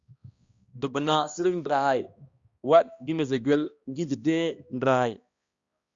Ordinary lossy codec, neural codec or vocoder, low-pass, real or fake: Opus, 64 kbps; codec, 16 kHz, 1 kbps, X-Codec, HuBERT features, trained on balanced general audio; 7.2 kHz; fake